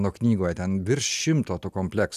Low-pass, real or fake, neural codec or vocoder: 14.4 kHz; real; none